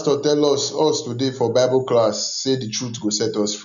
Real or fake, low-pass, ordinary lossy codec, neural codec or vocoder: real; 7.2 kHz; none; none